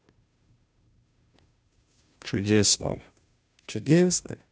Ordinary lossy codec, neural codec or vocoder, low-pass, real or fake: none; codec, 16 kHz, 0.5 kbps, FunCodec, trained on Chinese and English, 25 frames a second; none; fake